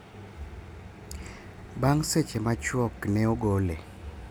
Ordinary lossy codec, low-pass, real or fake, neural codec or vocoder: none; none; real; none